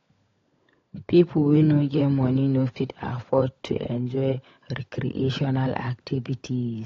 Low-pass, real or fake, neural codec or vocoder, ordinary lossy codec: 7.2 kHz; fake; codec, 16 kHz, 16 kbps, FunCodec, trained on LibriTTS, 50 frames a second; AAC, 32 kbps